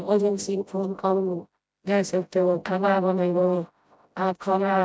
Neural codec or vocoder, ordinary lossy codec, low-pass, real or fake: codec, 16 kHz, 0.5 kbps, FreqCodec, smaller model; none; none; fake